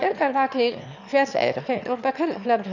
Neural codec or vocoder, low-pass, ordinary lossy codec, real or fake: autoencoder, 22.05 kHz, a latent of 192 numbers a frame, VITS, trained on one speaker; 7.2 kHz; none; fake